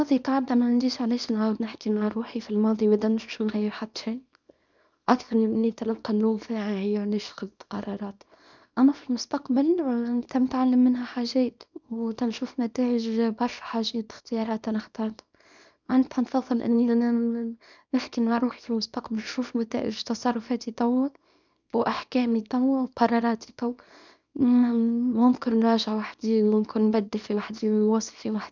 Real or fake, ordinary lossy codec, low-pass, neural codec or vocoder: fake; none; 7.2 kHz; codec, 24 kHz, 0.9 kbps, WavTokenizer, small release